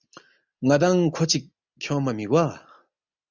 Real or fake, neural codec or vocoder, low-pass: real; none; 7.2 kHz